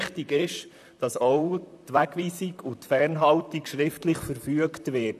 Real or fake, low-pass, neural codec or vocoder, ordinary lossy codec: fake; 14.4 kHz; vocoder, 44.1 kHz, 128 mel bands, Pupu-Vocoder; none